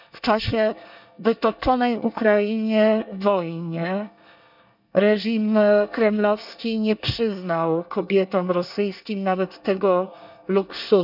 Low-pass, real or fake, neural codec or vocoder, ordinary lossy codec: 5.4 kHz; fake; codec, 24 kHz, 1 kbps, SNAC; none